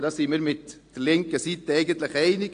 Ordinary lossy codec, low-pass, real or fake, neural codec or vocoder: AAC, 48 kbps; 9.9 kHz; real; none